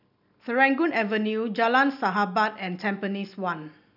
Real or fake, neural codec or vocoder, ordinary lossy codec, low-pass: real; none; none; 5.4 kHz